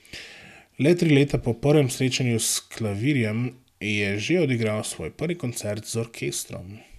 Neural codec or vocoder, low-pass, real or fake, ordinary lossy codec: none; 14.4 kHz; real; none